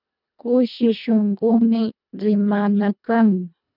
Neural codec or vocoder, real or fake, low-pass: codec, 24 kHz, 1.5 kbps, HILCodec; fake; 5.4 kHz